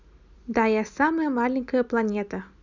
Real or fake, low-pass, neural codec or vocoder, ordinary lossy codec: real; 7.2 kHz; none; none